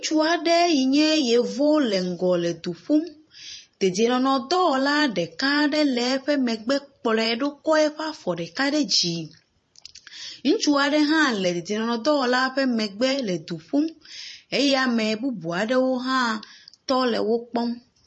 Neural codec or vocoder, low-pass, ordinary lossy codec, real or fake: vocoder, 44.1 kHz, 128 mel bands every 512 samples, BigVGAN v2; 10.8 kHz; MP3, 32 kbps; fake